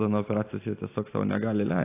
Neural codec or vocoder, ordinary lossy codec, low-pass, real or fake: none; AAC, 24 kbps; 3.6 kHz; real